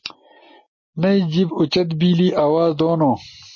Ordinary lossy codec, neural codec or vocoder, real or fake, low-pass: MP3, 32 kbps; none; real; 7.2 kHz